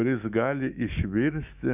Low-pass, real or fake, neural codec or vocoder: 3.6 kHz; fake; autoencoder, 48 kHz, 128 numbers a frame, DAC-VAE, trained on Japanese speech